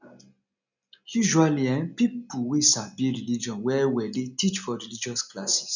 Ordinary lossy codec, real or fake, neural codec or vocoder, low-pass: none; real; none; 7.2 kHz